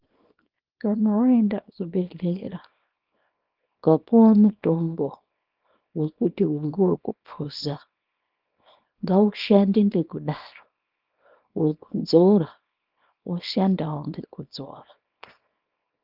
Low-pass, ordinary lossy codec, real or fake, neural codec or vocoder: 5.4 kHz; Opus, 32 kbps; fake; codec, 24 kHz, 0.9 kbps, WavTokenizer, small release